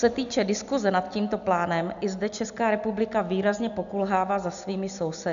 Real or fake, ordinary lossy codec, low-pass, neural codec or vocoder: real; AAC, 96 kbps; 7.2 kHz; none